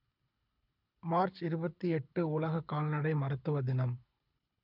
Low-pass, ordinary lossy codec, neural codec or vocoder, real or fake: 5.4 kHz; none; codec, 24 kHz, 6 kbps, HILCodec; fake